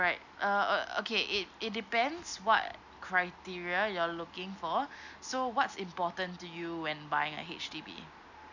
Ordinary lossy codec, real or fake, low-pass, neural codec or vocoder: none; real; 7.2 kHz; none